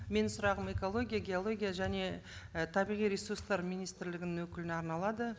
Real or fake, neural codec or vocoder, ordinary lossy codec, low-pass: real; none; none; none